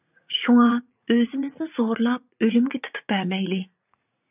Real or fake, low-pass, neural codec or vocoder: fake; 3.6 kHz; vocoder, 44.1 kHz, 80 mel bands, Vocos